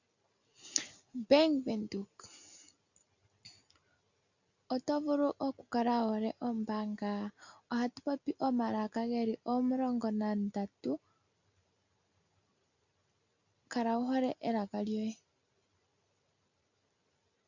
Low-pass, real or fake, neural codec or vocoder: 7.2 kHz; real; none